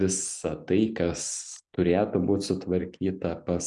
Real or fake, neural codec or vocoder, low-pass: real; none; 10.8 kHz